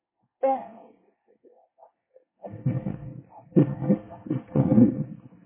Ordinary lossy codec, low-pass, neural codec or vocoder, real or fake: MP3, 16 kbps; 3.6 kHz; codec, 24 kHz, 1 kbps, SNAC; fake